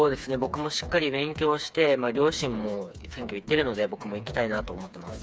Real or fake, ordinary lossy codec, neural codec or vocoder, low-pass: fake; none; codec, 16 kHz, 4 kbps, FreqCodec, smaller model; none